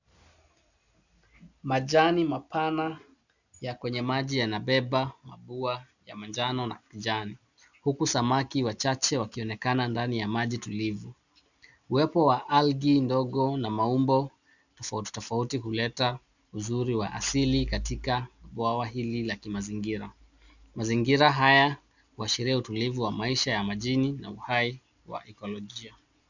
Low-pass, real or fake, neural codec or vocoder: 7.2 kHz; real; none